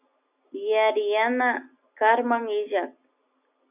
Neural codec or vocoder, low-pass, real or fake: none; 3.6 kHz; real